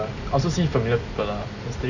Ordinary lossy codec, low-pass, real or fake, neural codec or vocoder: none; 7.2 kHz; real; none